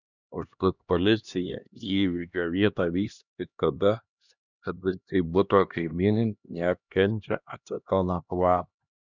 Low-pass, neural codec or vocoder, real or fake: 7.2 kHz; codec, 16 kHz, 1 kbps, X-Codec, HuBERT features, trained on LibriSpeech; fake